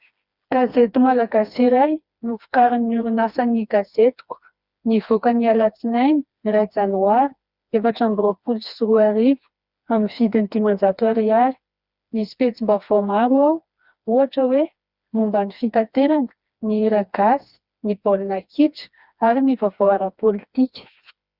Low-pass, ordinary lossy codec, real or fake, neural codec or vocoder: 5.4 kHz; Opus, 64 kbps; fake; codec, 16 kHz, 2 kbps, FreqCodec, smaller model